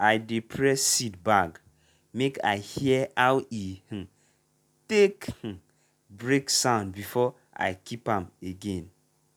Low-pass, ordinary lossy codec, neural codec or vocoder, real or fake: 19.8 kHz; none; none; real